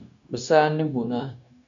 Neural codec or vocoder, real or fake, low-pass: codec, 16 kHz, 0.9 kbps, LongCat-Audio-Codec; fake; 7.2 kHz